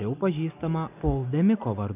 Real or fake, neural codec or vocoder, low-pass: real; none; 3.6 kHz